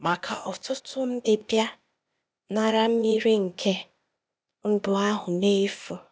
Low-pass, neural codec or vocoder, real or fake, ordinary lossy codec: none; codec, 16 kHz, 0.8 kbps, ZipCodec; fake; none